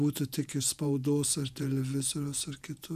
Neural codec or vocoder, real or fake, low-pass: vocoder, 48 kHz, 128 mel bands, Vocos; fake; 14.4 kHz